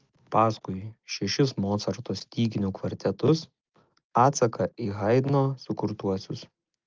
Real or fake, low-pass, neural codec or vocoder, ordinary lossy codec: real; 7.2 kHz; none; Opus, 32 kbps